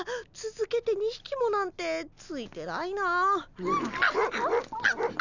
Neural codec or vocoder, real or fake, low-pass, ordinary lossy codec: none; real; 7.2 kHz; none